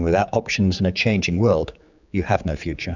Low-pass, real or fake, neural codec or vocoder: 7.2 kHz; fake; codec, 16 kHz, 4 kbps, X-Codec, HuBERT features, trained on general audio